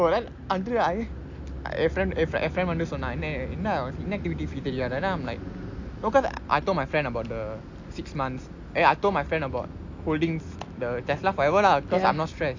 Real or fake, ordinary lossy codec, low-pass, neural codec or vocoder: real; AAC, 48 kbps; 7.2 kHz; none